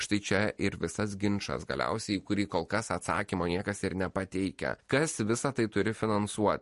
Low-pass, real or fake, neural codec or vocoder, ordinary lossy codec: 14.4 kHz; real; none; MP3, 48 kbps